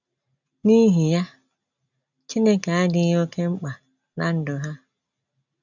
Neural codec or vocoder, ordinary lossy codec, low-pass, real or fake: none; none; 7.2 kHz; real